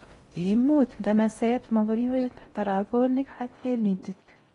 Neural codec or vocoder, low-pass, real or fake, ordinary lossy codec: codec, 16 kHz in and 24 kHz out, 0.6 kbps, FocalCodec, streaming, 2048 codes; 10.8 kHz; fake; AAC, 32 kbps